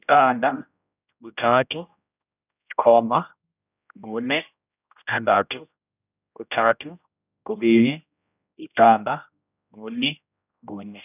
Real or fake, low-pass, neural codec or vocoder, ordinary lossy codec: fake; 3.6 kHz; codec, 16 kHz, 0.5 kbps, X-Codec, HuBERT features, trained on general audio; none